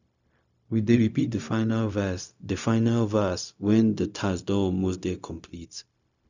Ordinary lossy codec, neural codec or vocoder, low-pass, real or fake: none; codec, 16 kHz, 0.4 kbps, LongCat-Audio-Codec; 7.2 kHz; fake